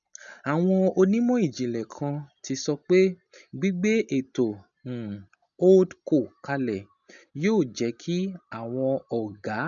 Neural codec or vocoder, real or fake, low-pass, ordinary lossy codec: none; real; 7.2 kHz; none